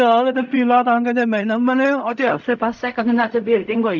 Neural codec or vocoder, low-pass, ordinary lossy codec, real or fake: codec, 16 kHz in and 24 kHz out, 0.4 kbps, LongCat-Audio-Codec, fine tuned four codebook decoder; 7.2 kHz; none; fake